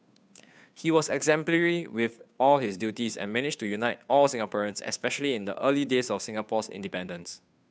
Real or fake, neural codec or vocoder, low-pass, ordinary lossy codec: fake; codec, 16 kHz, 2 kbps, FunCodec, trained on Chinese and English, 25 frames a second; none; none